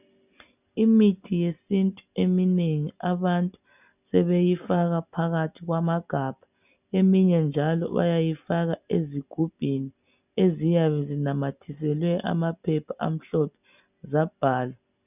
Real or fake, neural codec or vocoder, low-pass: real; none; 3.6 kHz